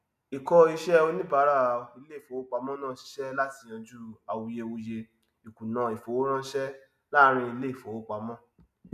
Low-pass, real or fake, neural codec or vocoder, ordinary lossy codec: 14.4 kHz; real; none; none